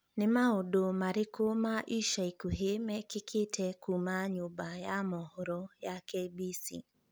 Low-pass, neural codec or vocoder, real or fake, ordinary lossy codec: none; none; real; none